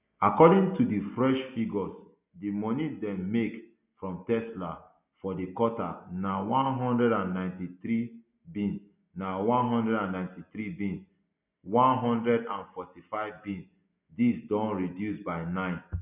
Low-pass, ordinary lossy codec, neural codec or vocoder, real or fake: 3.6 kHz; none; none; real